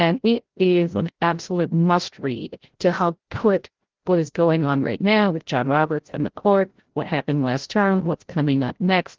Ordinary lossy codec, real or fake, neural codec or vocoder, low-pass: Opus, 16 kbps; fake; codec, 16 kHz, 0.5 kbps, FreqCodec, larger model; 7.2 kHz